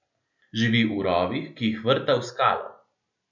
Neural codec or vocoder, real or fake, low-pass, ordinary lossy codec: none; real; 7.2 kHz; none